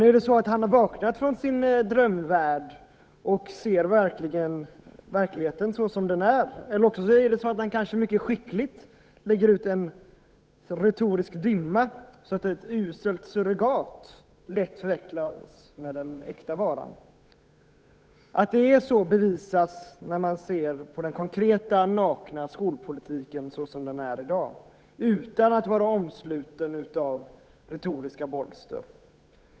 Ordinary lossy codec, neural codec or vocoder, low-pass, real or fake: none; codec, 16 kHz, 8 kbps, FunCodec, trained on Chinese and English, 25 frames a second; none; fake